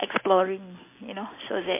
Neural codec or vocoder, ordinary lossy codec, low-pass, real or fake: none; MP3, 24 kbps; 3.6 kHz; real